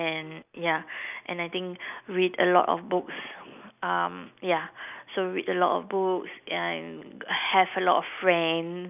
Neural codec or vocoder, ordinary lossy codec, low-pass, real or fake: none; none; 3.6 kHz; real